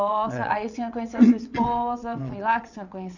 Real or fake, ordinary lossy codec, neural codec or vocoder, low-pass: fake; none; vocoder, 22.05 kHz, 80 mel bands, WaveNeXt; 7.2 kHz